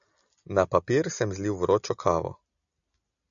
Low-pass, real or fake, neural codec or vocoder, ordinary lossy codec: 7.2 kHz; real; none; MP3, 96 kbps